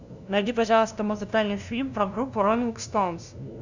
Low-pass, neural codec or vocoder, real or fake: 7.2 kHz; codec, 16 kHz, 0.5 kbps, FunCodec, trained on LibriTTS, 25 frames a second; fake